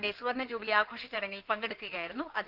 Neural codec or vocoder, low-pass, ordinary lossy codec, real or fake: codec, 16 kHz in and 24 kHz out, 2.2 kbps, FireRedTTS-2 codec; 5.4 kHz; Opus, 32 kbps; fake